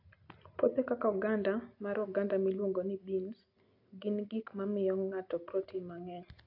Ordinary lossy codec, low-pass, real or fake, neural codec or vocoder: AAC, 32 kbps; 5.4 kHz; real; none